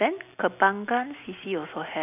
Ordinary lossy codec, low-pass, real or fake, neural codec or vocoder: none; 3.6 kHz; real; none